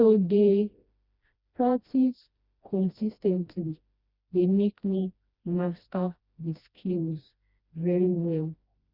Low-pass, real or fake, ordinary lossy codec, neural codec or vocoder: 5.4 kHz; fake; Opus, 64 kbps; codec, 16 kHz, 1 kbps, FreqCodec, smaller model